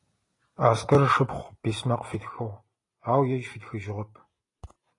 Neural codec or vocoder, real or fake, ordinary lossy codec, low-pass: none; real; AAC, 32 kbps; 10.8 kHz